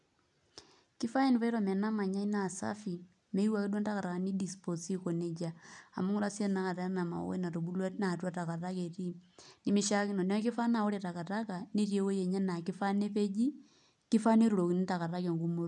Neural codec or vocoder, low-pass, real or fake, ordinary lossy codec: none; 10.8 kHz; real; none